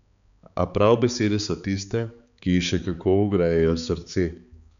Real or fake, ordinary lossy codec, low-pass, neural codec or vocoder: fake; none; 7.2 kHz; codec, 16 kHz, 4 kbps, X-Codec, HuBERT features, trained on balanced general audio